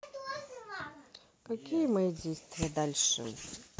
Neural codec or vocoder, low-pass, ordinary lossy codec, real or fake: none; none; none; real